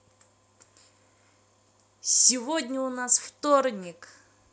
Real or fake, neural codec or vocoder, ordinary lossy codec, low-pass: real; none; none; none